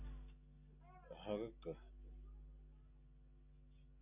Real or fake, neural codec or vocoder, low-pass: real; none; 3.6 kHz